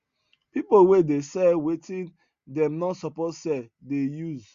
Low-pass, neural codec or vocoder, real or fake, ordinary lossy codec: 7.2 kHz; none; real; Opus, 64 kbps